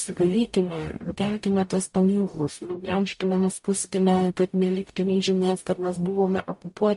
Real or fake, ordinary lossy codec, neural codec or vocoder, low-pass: fake; MP3, 48 kbps; codec, 44.1 kHz, 0.9 kbps, DAC; 14.4 kHz